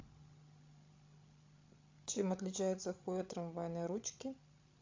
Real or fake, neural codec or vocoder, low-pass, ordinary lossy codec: fake; codec, 16 kHz, 16 kbps, FreqCodec, larger model; 7.2 kHz; MP3, 64 kbps